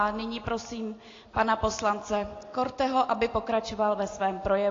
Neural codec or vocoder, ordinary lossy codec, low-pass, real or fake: none; AAC, 32 kbps; 7.2 kHz; real